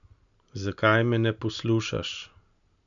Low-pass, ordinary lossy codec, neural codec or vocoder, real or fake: 7.2 kHz; none; none; real